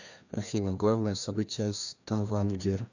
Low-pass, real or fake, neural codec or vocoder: 7.2 kHz; fake; codec, 16 kHz, 1 kbps, FreqCodec, larger model